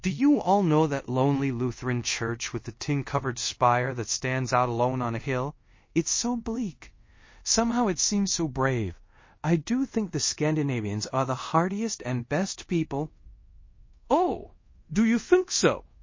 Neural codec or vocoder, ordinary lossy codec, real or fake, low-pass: codec, 24 kHz, 0.5 kbps, DualCodec; MP3, 32 kbps; fake; 7.2 kHz